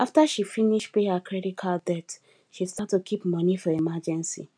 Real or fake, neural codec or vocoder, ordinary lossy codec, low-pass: real; none; none; 9.9 kHz